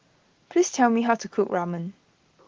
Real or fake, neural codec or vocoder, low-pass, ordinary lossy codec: real; none; 7.2 kHz; Opus, 16 kbps